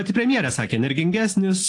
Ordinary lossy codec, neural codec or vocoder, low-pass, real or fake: AAC, 48 kbps; none; 10.8 kHz; real